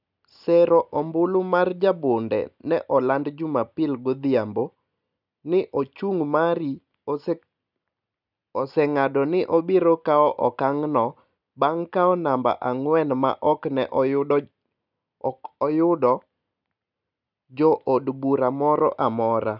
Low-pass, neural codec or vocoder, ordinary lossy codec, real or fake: 5.4 kHz; none; none; real